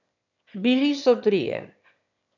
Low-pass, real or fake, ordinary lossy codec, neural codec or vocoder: 7.2 kHz; fake; none; autoencoder, 22.05 kHz, a latent of 192 numbers a frame, VITS, trained on one speaker